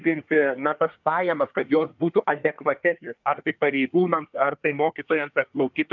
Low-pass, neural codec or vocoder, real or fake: 7.2 kHz; codec, 24 kHz, 1 kbps, SNAC; fake